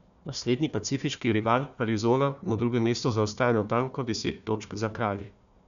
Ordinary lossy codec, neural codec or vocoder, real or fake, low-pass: none; codec, 16 kHz, 1 kbps, FunCodec, trained on Chinese and English, 50 frames a second; fake; 7.2 kHz